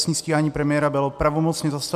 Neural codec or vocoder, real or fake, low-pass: none; real; 14.4 kHz